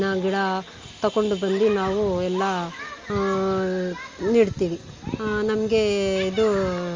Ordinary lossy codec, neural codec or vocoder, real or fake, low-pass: Opus, 32 kbps; none; real; 7.2 kHz